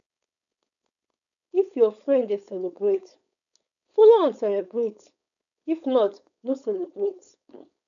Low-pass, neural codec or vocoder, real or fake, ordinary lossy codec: 7.2 kHz; codec, 16 kHz, 4.8 kbps, FACodec; fake; none